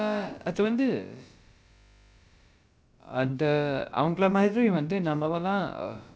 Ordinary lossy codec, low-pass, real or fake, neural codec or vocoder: none; none; fake; codec, 16 kHz, about 1 kbps, DyCAST, with the encoder's durations